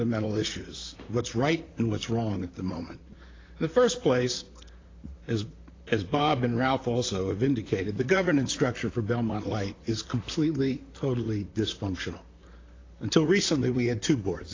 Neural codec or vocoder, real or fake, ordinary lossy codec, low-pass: vocoder, 44.1 kHz, 128 mel bands, Pupu-Vocoder; fake; AAC, 32 kbps; 7.2 kHz